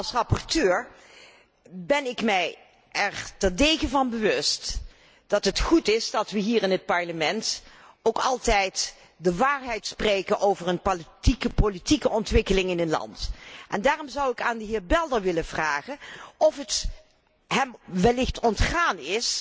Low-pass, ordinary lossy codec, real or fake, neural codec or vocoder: none; none; real; none